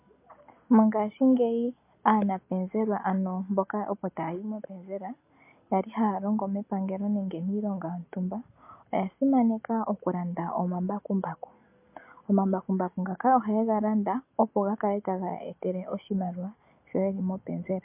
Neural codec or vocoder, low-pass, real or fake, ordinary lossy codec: none; 3.6 kHz; real; MP3, 24 kbps